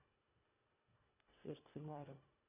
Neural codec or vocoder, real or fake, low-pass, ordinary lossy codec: codec, 24 kHz, 1.5 kbps, HILCodec; fake; 3.6 kHz; none